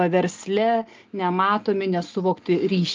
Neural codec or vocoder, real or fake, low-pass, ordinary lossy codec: none; real; 7.2 kHz; Opus, 32 kbps